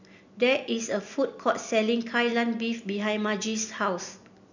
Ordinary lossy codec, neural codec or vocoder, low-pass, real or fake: none; none; 7.2 kHz; real